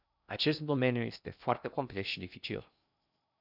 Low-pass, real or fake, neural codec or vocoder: 5.4 kHz; fake; codec, 16 kHz in and 24 kHz out, 0.6 kbps, FocalCodec, streaming, 2048 codes